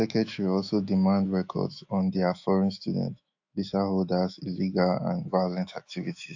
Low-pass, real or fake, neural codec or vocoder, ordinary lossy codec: 7.2 kHz; fake; autoencoder, 48 kHz, 128 numbers a frame, DAC-VAE, trained on Japanese speech; AAC, 48 kbps